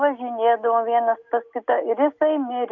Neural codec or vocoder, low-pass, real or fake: none; 7.2 kHz; real